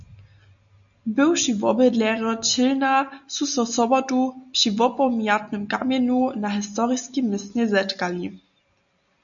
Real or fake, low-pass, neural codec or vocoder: real; 7.2 kHz; none